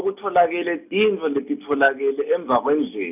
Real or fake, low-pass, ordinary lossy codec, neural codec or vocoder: real; 3.6 kHz; none; none